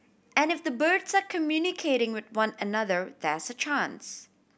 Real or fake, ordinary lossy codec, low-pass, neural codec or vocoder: real; none; none; none